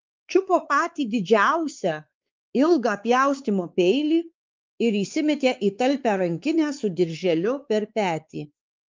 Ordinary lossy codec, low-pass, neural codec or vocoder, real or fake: Opus, 24 kbps; 7.2 kHz; codec, 16 kHz, 4 kbps, X-Codec, WavLM features, trained on Multilingual LibriSpeech; fake